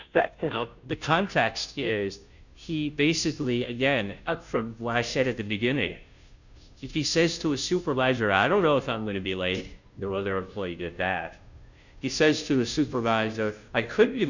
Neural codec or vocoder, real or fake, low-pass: codec, 16 kHz, 0.5 kbps, FunCodec, trained on Chinese and English, 25 frames a second; fake; 7.2 kHz